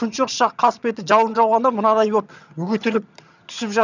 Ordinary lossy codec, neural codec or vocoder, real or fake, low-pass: none; vocoder, 22.05 kHz, 80 mel bands, HiFi-GAN; fake; 7.2 kHz